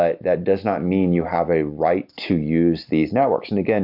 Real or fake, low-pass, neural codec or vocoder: real; 5.4 kHz; none